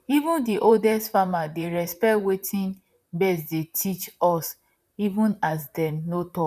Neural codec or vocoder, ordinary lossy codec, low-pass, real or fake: vocoder, 44.1 kHz, 128 mel bands, Pupu-Vocoder; none; 14.4 kHz; fake